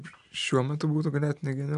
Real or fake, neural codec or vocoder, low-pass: real; none; 10.8 kHz